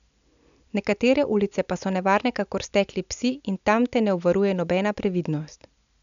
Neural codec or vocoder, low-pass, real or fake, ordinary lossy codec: none; 7.2 kHz; real; none